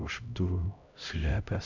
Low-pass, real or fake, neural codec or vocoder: 7.2 kHz; fake; codec, 16 kHz, 0.5 kbps, X-Codec, HuBERT features, trained on LibriSpeech